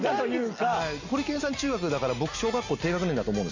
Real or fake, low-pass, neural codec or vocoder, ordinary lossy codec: real; 7.2 kHz; none; none